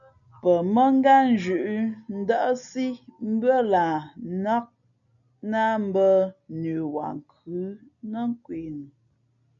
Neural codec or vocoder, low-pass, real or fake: none; 7.2 kHz; real